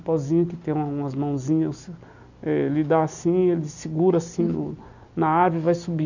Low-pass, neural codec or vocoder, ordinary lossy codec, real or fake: 7.2 kHz; none; none; real